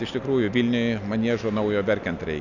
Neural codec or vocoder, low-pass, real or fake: none; 7.2 kHz; real